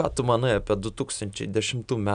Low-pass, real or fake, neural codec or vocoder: 9.9 kHz; real; none